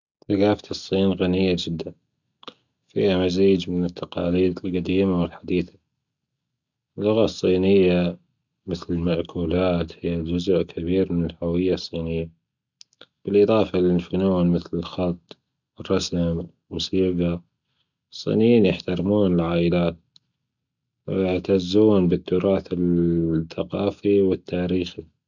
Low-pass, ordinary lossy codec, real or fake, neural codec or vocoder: 7.2 kHz; none; real; none